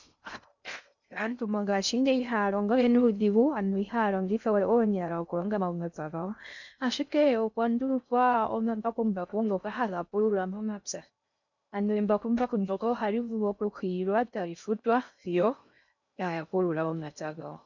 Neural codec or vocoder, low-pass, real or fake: codec, 16 kHz in and 24 kHz out, 0.6 kbps, FocalCodec, streaming, 2048 codes; 7.2 kHz; fake